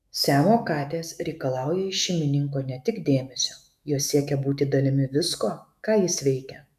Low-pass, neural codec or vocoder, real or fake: 14.4 kHz; autoencoder, 48 kHz, 128 numbers a frame, DAC-VAE, trained on Japanese speech; fake